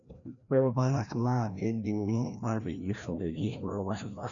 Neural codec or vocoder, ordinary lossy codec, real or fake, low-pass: codec, 16 kHz, 1 kbps, FreqCodec, larger model; none; fake; 7.2 kHz